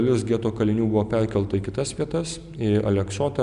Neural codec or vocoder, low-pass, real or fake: none; 10.8 kHz; real